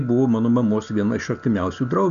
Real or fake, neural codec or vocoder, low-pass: real; none; 7.2 kHz